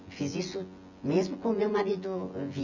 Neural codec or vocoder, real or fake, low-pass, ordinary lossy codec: vocoder, 24 kHz, 100 mel bands, Vocos; fake; 7.2 kHz; none